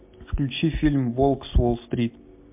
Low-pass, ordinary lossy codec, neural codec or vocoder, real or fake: 3.6 kHz; MP3, 32 kbps; none; real